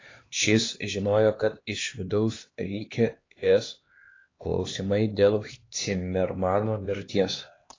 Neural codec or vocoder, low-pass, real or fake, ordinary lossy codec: codec, 16 kHz, 2 kbps, X-Codec, HuBERT features, trained on LibriSpeech; 7.2 kHz; fake; AAC, 32 kbps